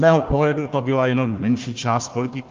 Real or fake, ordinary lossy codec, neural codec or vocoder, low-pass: fake; Opus, 24 kbps; codec, 16 kHz, 1 kbps, FunCodec, trained on Chinese and English, 50 frames a second; 7.2 kHz